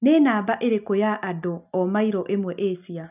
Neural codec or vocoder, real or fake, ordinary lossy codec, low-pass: none; real; none; 3.6 kHz